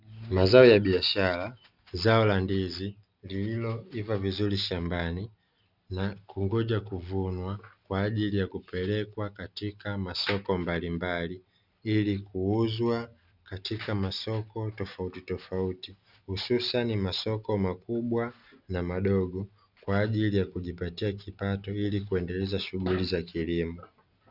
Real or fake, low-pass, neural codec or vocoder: real; 5.4 kHz; none